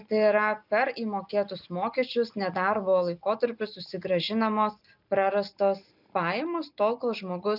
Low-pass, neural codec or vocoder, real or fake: 5.4 kHz; none; real